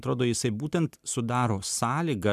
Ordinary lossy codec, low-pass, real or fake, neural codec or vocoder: MP3, 96 kbps; 14.4 kHz; real; none